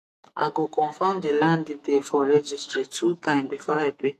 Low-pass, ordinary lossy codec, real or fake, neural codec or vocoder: 14.4 kHz; AAC, 64 kbps; fake; codec, 44.1 kHz, 2.6 kbps, SNAC